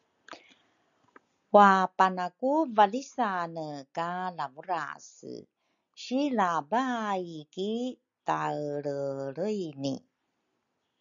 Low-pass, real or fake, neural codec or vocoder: 7.2 kHz; real; none